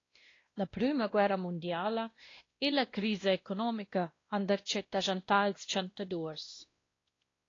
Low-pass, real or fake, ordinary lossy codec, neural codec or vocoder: 7.2 kHz; fake; AAC, 32 kbps; codec, 16 kHz, 1 kbps, X-Codec, WavLM features, trained on Multilingual LibriSpeech